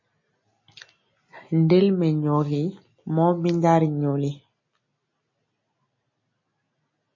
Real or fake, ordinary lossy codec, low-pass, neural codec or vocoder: real; MP3, 32 kbps; 7.2 kHz; none